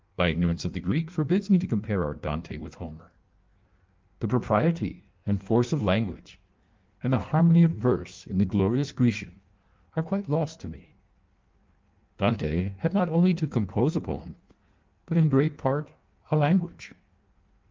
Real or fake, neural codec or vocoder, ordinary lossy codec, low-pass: fake; codec, 16 kHz in and 24 kHz out, 1.1 kbps, FireRedTTS-2 codec; Opus, 32 kbps; 7.2 kHz